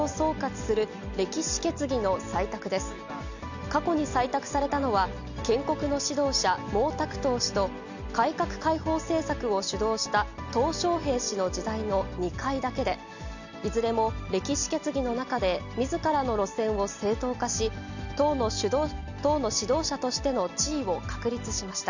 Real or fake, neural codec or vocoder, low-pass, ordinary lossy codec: real; none; 7.2 kHz; none